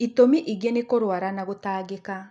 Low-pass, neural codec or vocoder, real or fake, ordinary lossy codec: none; none; real; none